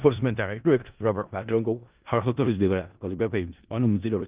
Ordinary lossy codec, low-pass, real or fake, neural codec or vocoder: Opus, 24 kbps; 3.6 kHz; fake; codec, 16 kHz in and 24 kHz out, 0.4 kbps, LongCat-Audio-Codec, four codebook decoder